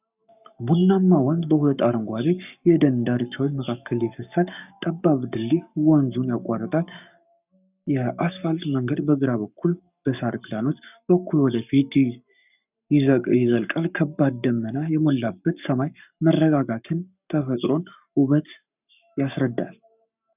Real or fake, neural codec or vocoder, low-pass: fake; codec, 44.1 kHz, 7.8 kbps, Pupu-Codec; 3.6 kHz